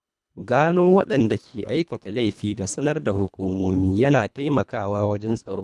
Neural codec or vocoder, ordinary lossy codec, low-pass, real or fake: codec, 24 kHz, 1.5 kbps, HILCodec; none; none; fake